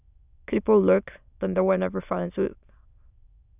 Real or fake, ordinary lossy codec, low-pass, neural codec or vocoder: fake; none; 3.6 kHz; autoencoder, 22.05 kHz, a latent of 192 numbers a frame, VITS, trained on many speakers